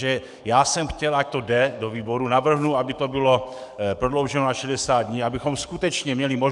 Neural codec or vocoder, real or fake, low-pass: codec, 44.1 kHz, 7.8 kbps, DAC; fake; 10.8 kHz